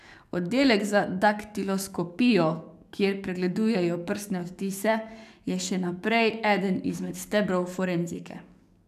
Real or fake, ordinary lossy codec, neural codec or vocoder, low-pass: fake; none; codec, 44.1 kHz, 7.8 kbps, DAC; 14.4 kHz